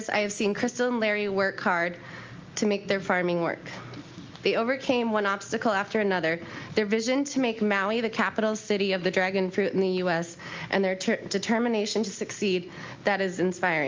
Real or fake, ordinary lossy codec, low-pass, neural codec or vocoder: real; Opus, 24 kbps; 7.2 kHz; none